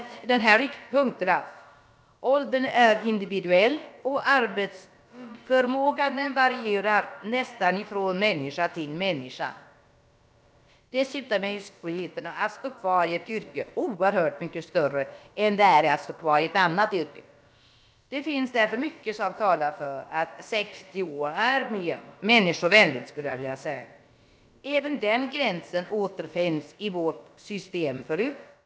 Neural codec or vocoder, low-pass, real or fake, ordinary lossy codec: codec, 16 kHz, about 1 kbps, DyCAST, with the encoder's durations; none; fake; none